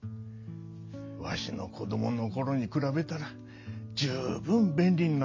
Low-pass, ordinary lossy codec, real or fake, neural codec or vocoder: 7.2 kHz; MP3, 48 kbps; real; none